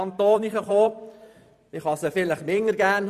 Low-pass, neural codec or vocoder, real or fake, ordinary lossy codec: 14.4 kHz; vocoder, 44.1 kHz, 128 mel bands every 512 samples, BigVGAN v2; fake; none